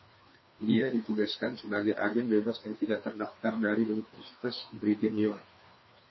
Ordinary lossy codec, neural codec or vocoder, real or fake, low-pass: MP3, 24 kbps; codec, 16 kHz, 2 kbps, FreqCodec, larger model; fake; 7.2 kHz